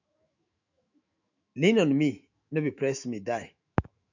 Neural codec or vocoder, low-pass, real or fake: autoencoder, 48 kHz, 128 numbers a frame, DAC-VAE, trained on Japanese speech; 7.2 kHz; fake